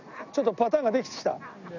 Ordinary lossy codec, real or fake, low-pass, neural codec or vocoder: none; real; 7.2 kHz; none